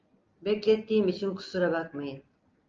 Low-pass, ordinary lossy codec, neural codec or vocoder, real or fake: 7.2 kHz; Opus, 24 kbps; none; real